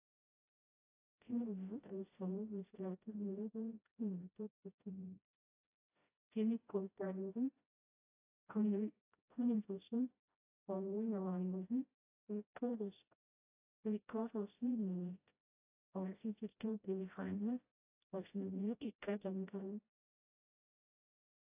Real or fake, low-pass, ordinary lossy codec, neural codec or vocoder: fake; 3.6 kHz; AAC, 24 kbps; codec, 16 kHz, 0.5 kbps, FreqCodec, smaller model